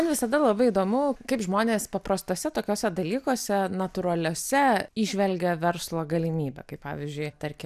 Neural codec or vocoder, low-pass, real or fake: none; 14.4 kHz; real